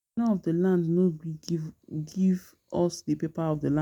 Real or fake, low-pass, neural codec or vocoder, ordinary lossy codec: real; 19.8 kHz; none; none